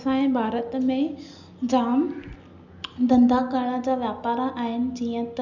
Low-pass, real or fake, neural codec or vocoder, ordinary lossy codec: 7.2 kHz; real; none; none